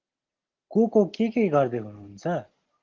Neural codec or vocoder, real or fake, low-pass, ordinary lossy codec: none; real; 7.2 kHz; Opus, 16 kbps